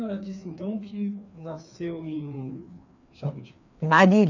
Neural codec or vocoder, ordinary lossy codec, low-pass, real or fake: codec, 16 kHz, 2 kbps, FreqCodec, larger model; none; 7.2 kHz; fake